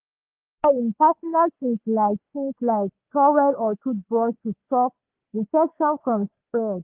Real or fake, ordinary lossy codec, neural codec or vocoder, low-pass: fake; Opus, 16 kbps; codec, 44.1 kHz, 3.4 kbps, Pupu-Codec; 3.6 kHz